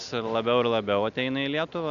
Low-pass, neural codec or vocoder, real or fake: 7.2 kHz; none; real